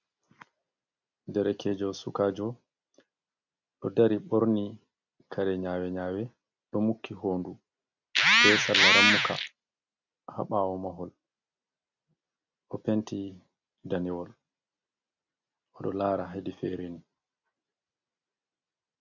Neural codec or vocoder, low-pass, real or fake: none; 7.2 kHz; real